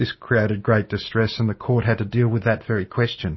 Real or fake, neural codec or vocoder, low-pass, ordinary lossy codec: real; none; 7.2 kHz; MP3, 24 kbps